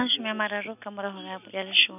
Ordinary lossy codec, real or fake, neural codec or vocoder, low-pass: none; real; none; 3.6 kHz